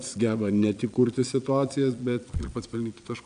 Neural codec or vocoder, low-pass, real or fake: vocoder, 22.05 kHz, 80 mel bands, Vocos; 9.9 kHz; fake